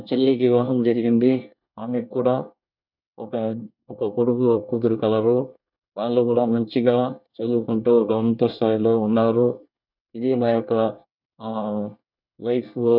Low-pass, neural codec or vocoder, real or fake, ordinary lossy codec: 5.4 kHz; codec, 24 kHz, 1 kbps, SNAC; fake; none